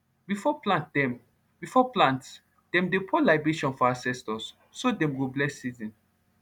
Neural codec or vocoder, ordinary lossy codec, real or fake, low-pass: none; none; real; 19.8 kHz